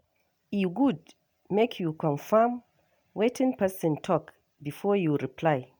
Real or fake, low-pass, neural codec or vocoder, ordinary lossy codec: real; none; none; none